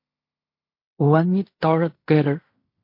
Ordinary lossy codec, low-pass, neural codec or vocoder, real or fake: MP3, 32 kbps; 5.4 kHz; codec, 16 kHz in and 24 kHz out, 0.4 kbps, LongCat-Audio-Codec, fine tuned four codebook decoder; fake